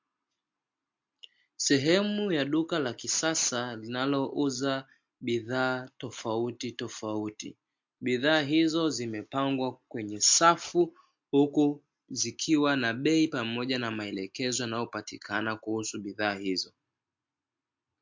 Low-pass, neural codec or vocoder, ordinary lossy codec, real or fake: 7.2 kHz; none; MP3, 48 kbps; real